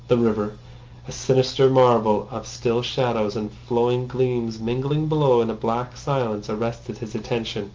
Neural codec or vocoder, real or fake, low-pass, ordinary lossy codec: none; real; 7.2 kHz; Opus, 32 kbps